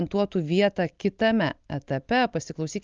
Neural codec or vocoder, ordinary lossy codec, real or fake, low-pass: none; Opus, 32 kbps; real; 7.2 kHz